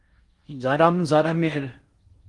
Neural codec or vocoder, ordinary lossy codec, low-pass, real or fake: codec, 16 kHz in and 24 kHz out, 0.6 kbps, FocalCodec, streaming, 4096 codes; Opus, 24 kbps; 10.8 kHz; fake